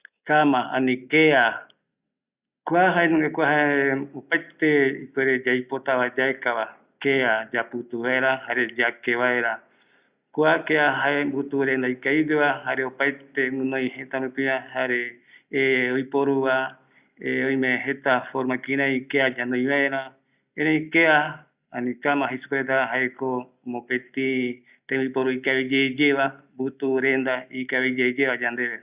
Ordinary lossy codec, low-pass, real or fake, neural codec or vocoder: Opus, 64 kbps; 3.6 kHz; real; none